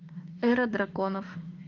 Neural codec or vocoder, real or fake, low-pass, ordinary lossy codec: autoencoder, 48 kHz, 32 numbers a frame, DAC-VAE, trained on Japanese speech; fake; 7.2 kHz; Opus, 24 kbps